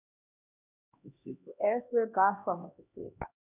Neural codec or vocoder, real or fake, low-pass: codec, 16 kHz, 1 kbps, X-Codec, HuBERT features, trained on LibriSpeech; fake; 3.6 kHz